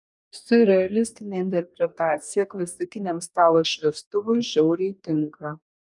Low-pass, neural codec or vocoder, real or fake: 10.8 kHz; codec, 44.1 kHz, 2.6 kbps, DAC; fake